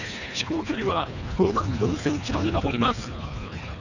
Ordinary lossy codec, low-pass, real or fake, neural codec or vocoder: none; 7.2 kHz; fake; codec, 24 kHz, 1.5 kbps, HILCodec